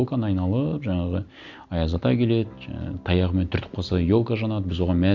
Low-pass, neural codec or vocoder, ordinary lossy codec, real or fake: 7.2 kHz; none; none; real